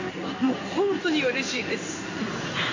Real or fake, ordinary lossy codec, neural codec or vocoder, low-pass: fake; AAC, 48 kbps; codec, 16 kHz in and 24 kHz out, 1 kbps, XY-Tokenizer; 7.2 kHz